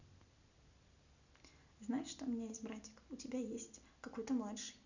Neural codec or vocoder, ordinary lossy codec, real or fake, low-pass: none; none; real; 7.2 kHz